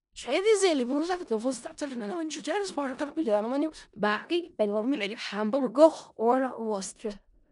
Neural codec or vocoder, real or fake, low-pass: codec, 16 kHz in and 24 kHz out, 0.4 kbps, LongCat-Audio-Codec, four codebook decoder; fake; 10.8 kHz